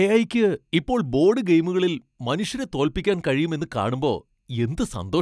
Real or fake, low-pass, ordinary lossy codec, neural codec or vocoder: real; none; none; none